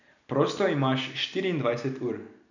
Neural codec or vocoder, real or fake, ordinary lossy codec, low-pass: none; real; none; 7.2 kHz